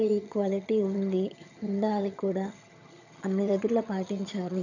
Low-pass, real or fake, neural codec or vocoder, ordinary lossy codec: 7.2 kHz; fake; vocoder, 22.05 kHz, 80 mel bands, HiFi-GAN; none